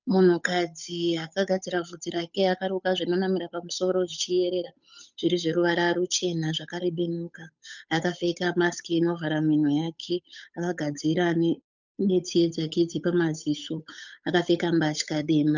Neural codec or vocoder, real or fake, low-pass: codec, 16 kHz, 8 kbps, FunCodec, trained on Chinese and English, 25 frames a second; fake; 7.2 kHz